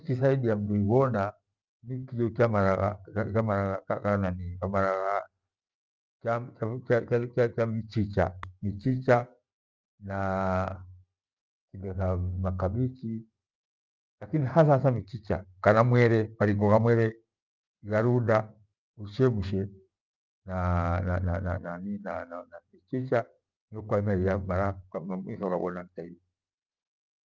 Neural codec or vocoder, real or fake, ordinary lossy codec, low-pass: none; real; Opus, 24 kbps; 7.2 kHz